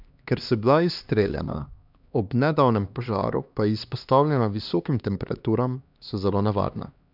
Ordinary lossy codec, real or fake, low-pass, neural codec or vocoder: none; fake; 5.4 kHz; codec, 16 kHz, 2 kbps, X-Codec, HuBERT features, trained on LibriSpeech